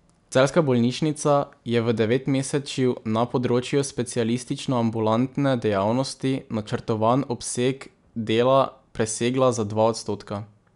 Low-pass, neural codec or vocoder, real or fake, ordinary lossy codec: 10.8 kHz; none; real; none